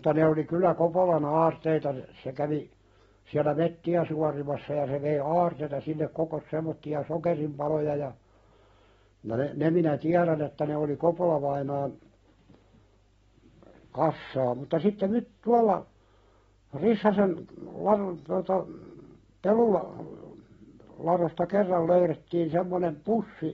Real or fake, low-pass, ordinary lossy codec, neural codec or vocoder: real; 9.9 kHz; AAC, 24 kbps; none